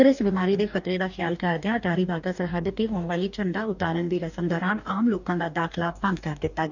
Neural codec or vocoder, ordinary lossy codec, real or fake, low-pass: codec, 44.1 kHz, 2.6 kbps, DAC; none; fake; 7.2 kHz